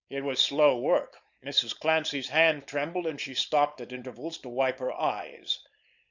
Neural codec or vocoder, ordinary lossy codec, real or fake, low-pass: codec, 16 kHz, 4.8 kbps, FACodec; Opus, 64 kbps; fake; 7.2 kHz